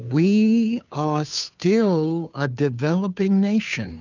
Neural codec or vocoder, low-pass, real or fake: codec, 16 kHz, 2 kbps, FunCodec, trained on Chinese and English, 25 frames a second; 7.2 kHz; fake